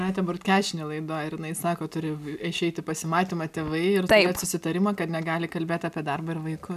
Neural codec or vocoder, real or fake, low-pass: none; real; 14.4 kHz